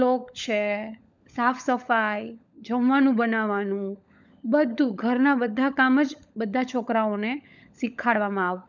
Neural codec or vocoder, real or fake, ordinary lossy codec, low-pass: codec, 16 kHz, 16 kbps, FunCodec, trained on LibriTTS, 50 frames a second; fake; none; 7.2 kHz